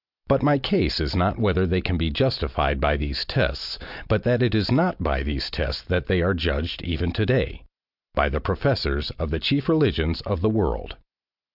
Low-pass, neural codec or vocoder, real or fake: 5.4 kHz; none; real